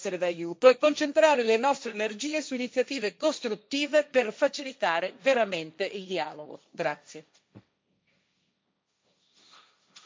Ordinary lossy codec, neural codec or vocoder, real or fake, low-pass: none; codec, 16 kHz, 1.1 kbps, Voila-Tokenizer; fake; none